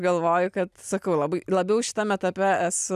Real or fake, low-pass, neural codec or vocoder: real; 14.4 kHz; none